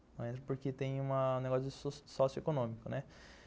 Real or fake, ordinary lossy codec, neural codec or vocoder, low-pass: real; none; none; none